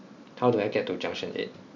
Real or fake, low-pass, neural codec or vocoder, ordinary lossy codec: real; 7.2 kHz; none; MP3, 64 kbps